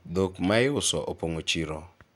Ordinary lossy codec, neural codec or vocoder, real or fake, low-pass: none; none; real; 19.8 kHz